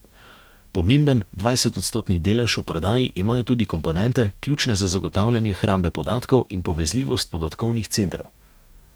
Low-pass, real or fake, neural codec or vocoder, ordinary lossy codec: none; fake; codec, 44.1 kHz, 2.6 kbps, DAC; none